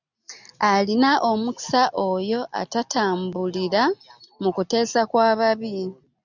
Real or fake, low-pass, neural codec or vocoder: real; 7.2 kHz; none